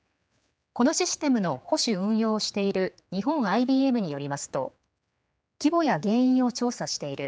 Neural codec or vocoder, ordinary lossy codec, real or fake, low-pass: codec, 16 kHz, 4 kbps, X-Codec, HuBERT features, trained on general audio; none; fake; none